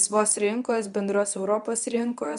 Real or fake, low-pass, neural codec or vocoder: fake; 10.8 kHz; codec, 24 kHz, 0.9 kbps, WavTokenizer, medium speech release version 1